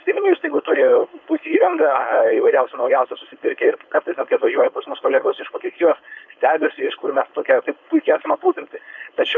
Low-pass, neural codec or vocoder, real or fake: 7.2 kHz; codec, 16 kHz, 4.8 kbps, FACodec; fake